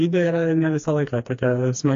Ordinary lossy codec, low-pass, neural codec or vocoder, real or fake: AAC, 48 kbps; 7.2 kHz; codec, 16 kHz, 2 kbps, FreqCodec, smaller model; fake